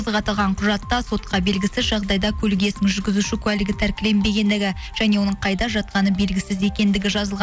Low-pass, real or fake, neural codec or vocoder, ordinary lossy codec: none; real; none; none